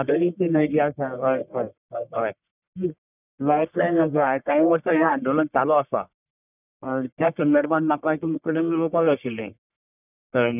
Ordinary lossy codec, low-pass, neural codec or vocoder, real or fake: none; 3.6 kHz; codec, 44.1 kHz, 1.7 kbps, Pupu-Codec; fake